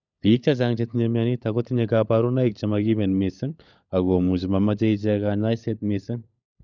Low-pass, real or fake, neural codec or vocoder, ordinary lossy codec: 7.2 kHz; fake; codec, 16 kHz, 16 kbps, FunCodec, trained on LibriTTS, 50 frames a second; none